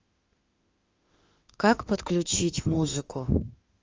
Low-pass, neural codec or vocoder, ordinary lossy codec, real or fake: 7.2 kHz; autoencoder, 48 kHz, 32 numbers a frame, DAC-VAE, trained on Japanese speech; Opus, 32 kbps; fake